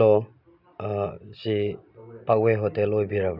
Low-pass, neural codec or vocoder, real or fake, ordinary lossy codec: 5.4 kHz; none; real; none